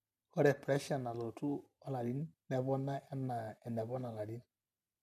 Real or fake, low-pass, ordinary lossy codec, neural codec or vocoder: real; 14.4 kHz; none; none